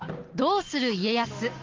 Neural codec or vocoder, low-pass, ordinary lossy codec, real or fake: none; 7.2 kHz; Opus, 16 kbps; real